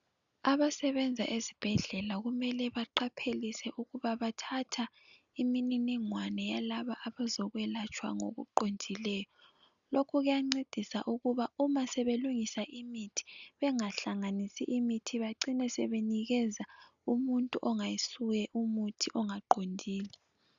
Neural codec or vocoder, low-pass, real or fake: none; 7.2 kHz; real